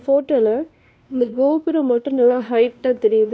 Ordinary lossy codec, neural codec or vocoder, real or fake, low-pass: none; codec, 16 kHz, 1 kbps, X-Codec, WavLM features, trained on Multilingual LibriSpeech; fake; none